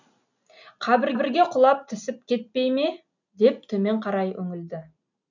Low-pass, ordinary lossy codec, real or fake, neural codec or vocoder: 7.2 kHz; none; real; none